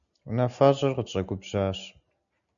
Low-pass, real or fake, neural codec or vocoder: 7.2 kHz; real; none